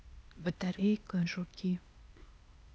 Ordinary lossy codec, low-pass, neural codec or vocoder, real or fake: none; none; codec, 16 kHz, 0.8 kbps, ZipCodec; fake